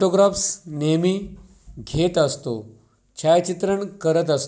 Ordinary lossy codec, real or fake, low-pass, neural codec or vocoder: none; real; none; none